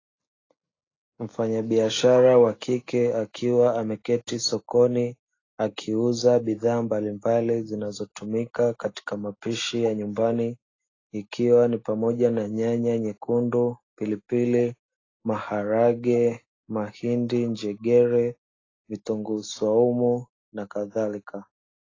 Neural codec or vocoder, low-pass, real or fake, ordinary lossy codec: none; 7.2 kHz; real; AAC, 32 kbps